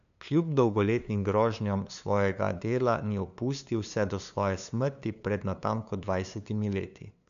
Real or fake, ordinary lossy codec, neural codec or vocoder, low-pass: fake; none; codec, 16 kHz, 2 kbps, FunCodec, trained on LibriTTS, 25 frames a second; 7.2 kHz